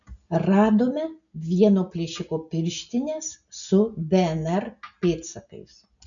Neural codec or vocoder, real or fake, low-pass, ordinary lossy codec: none; real; 7.2 kHz; MP3, 96 kbps